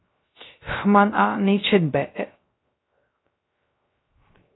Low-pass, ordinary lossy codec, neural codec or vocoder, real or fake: 7.2 kHz; AAC, 16 kbps; codec, 16 kHz, 0.3 kbps, FocalCodec; fake